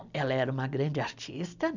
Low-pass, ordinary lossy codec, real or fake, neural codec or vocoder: 7.2 kHz; none; real; none